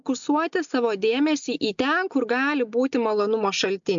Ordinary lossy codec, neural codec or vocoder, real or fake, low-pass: MP3, 64 kbps; none; real; 7.2 kHz